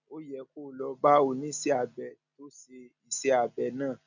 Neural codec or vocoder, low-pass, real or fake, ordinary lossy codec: none; 7.2 kHz; real; none